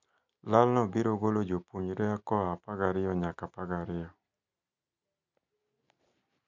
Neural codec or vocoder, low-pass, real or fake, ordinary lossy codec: none; 7.2 kHz; real; none